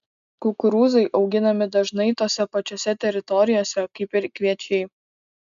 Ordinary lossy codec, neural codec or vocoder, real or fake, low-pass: MP3, 96 kbps; none; real; 7.2 kHz